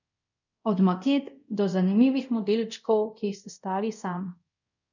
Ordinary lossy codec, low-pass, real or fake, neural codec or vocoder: none; 7.2 kHz; fake; codec, 24 kHz, 0.5 kbps, DualCodec